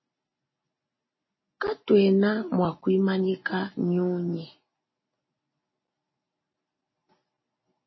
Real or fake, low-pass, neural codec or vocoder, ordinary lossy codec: real; 7.2 kHz; none; MP3, 24 kbps